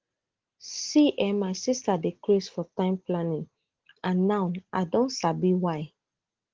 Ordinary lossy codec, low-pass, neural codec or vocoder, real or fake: Opus, 16 kbps; 7.2 kHz; none; real